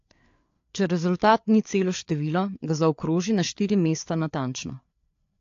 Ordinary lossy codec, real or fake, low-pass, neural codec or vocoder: AAC, 48 kbps; fake; 7.2 kHz; codec, 16 kHz, 4 kbps, FreqCodec, larger model